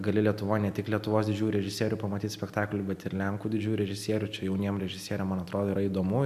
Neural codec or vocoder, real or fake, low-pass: none; real; 14.4 kHz